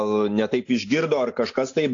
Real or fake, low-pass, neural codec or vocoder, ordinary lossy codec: real; 7.2 kHz; none; AAC, 48 kbps